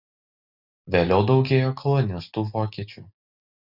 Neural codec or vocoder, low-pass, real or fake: none; 5.4 kHz; real